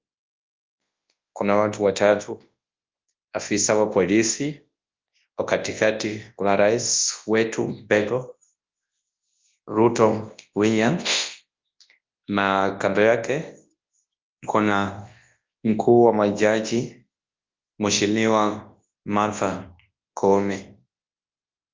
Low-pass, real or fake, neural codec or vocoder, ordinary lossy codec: 7.2 kHz; fake; codec, 24 kHz, 0.9 kbps, WavTokenizer, large speech release; Opus, 24 kbps